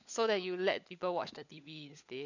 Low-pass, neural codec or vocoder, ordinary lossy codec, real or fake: 7.2 kHz; codec, 16 kHz, 16 kbps, FunCodec, trained on LibriTTS, 50 frames a second; none; fake